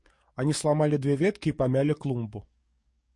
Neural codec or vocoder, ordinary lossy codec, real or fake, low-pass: codec, 44.1 kHz, 7.8 kbps, DAC; MP3, 48 kbps; fake; 10.8 kHz